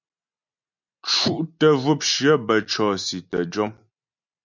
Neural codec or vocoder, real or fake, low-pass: none; real; 7.2 kHz